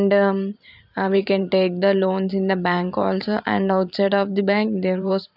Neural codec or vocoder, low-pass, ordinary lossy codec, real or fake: vocoder, 44.1 kHz, 128 mel bands every 512 samples, BigVGAN v2; 5.4 kHz; none; fake